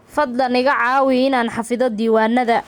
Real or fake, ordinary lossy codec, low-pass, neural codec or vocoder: real; none; 19.8 kHz; none